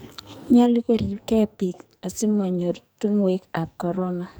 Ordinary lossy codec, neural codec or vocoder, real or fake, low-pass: none; codec, 44.1 kHz, 2.6 kbps, SNAC; fake; none